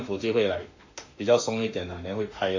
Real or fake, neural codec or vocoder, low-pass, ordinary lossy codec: fake; autoencoder, 48 kHz, 32 numbers a frame, DAC-VAE, trained on Japanese speech; 7.2 kHz; AAC, 48 kbps